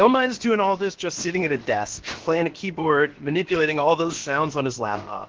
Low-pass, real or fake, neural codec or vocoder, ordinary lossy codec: 7.2 kHz; fake; codec, 16 kHz, about 1 kbps, DyCAST, with the encoder's durations; Opus, 16 kbps